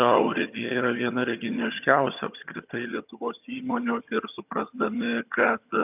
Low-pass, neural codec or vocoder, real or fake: 3.6 kHz; vocoder, 22.05 kHz, 80 mel bands, HiFi-GAN; fake